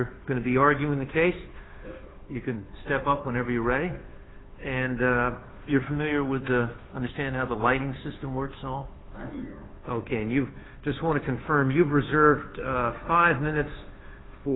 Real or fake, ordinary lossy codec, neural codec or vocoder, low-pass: fake; AAC, 16 kbps; codec, 16 kHz, 2 kbps, FunCodec, trained on Chinese and English, 25 frames a second; 7.2 kHz